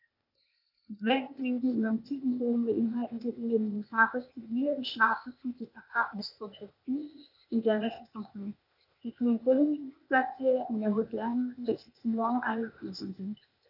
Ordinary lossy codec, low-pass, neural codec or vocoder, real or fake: none; 5.4 kHz; codec, 16 kHz, 0.8 kbps, ZipCodec; fake